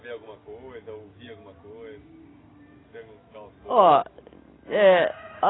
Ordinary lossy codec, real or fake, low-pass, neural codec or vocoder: AAC, 16 kbps; real; 7.2 kHz; none